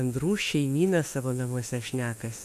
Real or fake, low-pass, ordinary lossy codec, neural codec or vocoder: fake; 14.4 kHz; MP3, 96 kbps; autoencoder, 48 kHz, 32 numbers a frame, DAC-VAE, trained on Japanese speech